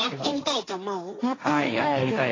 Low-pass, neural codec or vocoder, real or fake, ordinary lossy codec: 7.2 kHz; codec, 16 kHz, 1.1 kbps, Voila-Tokenizer; fake; AAC, 32 kbps